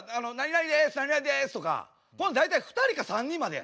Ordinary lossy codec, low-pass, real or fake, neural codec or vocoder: none; none; real; none